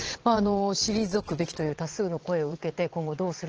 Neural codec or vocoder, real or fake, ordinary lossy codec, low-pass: vocoder, 22.05 kHz, 80 mel bands, WaveNeXt; fake; Opus, 16 kbps; 7.2 kHz